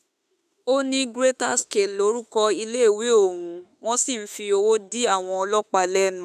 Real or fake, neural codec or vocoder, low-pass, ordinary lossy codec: fake; autoencoder, 48 kHz, 32 numbers a frame, DAC-VAE, trained on Japanese speech; 14.4 kHz; none